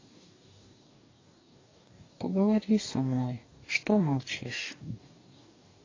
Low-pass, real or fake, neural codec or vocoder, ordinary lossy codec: 7.2 kHz; fake; codec, 44.1 kHz, 2.6 kbps, DAC; AAC, 32 kbps